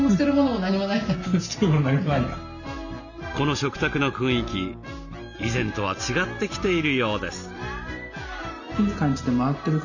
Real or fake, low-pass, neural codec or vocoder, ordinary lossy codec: real; 7.2 kHz; none; none